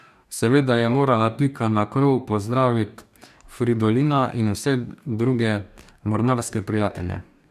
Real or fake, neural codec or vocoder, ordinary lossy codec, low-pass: fake; codec, 44.1 kHz, 2.6 kbps, DAC; none; 14.4 kHz